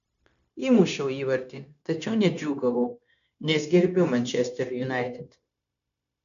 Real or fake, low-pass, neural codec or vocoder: fake; 7.2 kHz; codec, 16 kHz, 0.9 kbps, LongCat-Audio-Codec